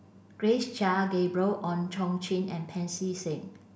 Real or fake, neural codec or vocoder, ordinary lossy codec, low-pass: real; none; none; none